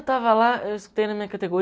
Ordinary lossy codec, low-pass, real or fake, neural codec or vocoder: none; none; real; none